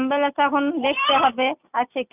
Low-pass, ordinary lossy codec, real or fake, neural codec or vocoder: 3.6 kHz; none; real; none